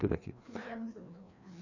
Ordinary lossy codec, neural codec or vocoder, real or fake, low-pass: none; codec, 16 kHz, 2 kbps, FreqCodec, larger model; fake; 7.2 kHz